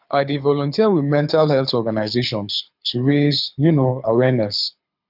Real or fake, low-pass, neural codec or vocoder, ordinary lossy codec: fake; 5.4 kHz; codec, 24 kHz, 6 kbps, HILCodec; none